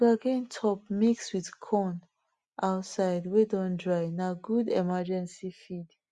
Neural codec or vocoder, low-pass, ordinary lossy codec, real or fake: none; 10.8 kHz; Opus, 64 kbps; real